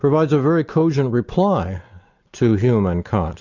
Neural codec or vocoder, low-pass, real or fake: none; 7.2 kHz; real